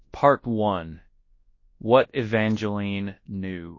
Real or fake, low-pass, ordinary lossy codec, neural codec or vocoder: fake; 7.2 kHz; MP3, 32 kbps; codec, 24 kHz, 0.9 kbps, WavTokenizer, large speech release